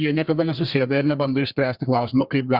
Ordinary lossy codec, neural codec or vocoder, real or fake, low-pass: Opus, 64 kbps; codec, 32 kHz, 1.9 kbps, SNAC; fake; 5.4 kHz